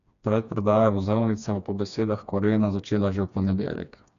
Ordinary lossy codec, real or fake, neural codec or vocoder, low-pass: none; fake; codec, 16 kHz, 2 kbps, FreqCodec, smaller model; 7.2 kHz